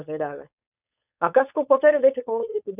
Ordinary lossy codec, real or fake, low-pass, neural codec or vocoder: none; fake; 3.6 kHz; codec, 16 kHz, 4.8 kbps, FACodec